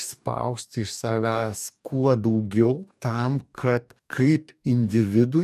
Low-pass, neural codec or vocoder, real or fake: 14.4 kHz; codec, 44.1 kHz, 2.6 kbps, DAC; fake